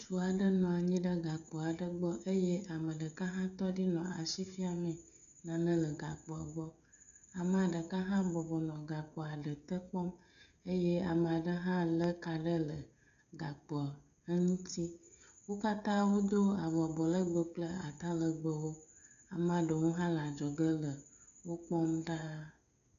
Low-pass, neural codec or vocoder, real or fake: 7.2 kHz; codec, 16 kHz, 16 kbps, FreqCodec, smaller model; fake